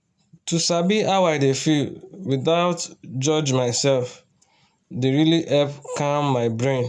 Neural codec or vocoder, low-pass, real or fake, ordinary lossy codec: none; none; real; none